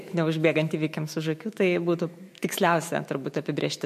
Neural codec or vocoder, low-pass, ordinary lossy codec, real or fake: autoencoder, 48 kHz, 128 numbers a frame, DAC-VAE, trained on Japanese speech; 14.4 kHz; MP3, 64 kbps; fake